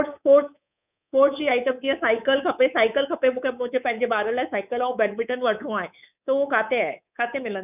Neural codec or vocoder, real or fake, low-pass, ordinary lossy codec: codec, 24 kHz, 3.1 kbps, DualCodec; fake; 3.6 kHz; none